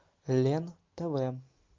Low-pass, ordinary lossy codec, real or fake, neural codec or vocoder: 7.2 kHz; Opus, 24 kbps; real; none